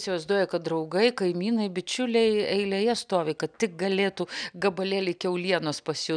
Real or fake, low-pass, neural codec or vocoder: real; 9.9 kHz; none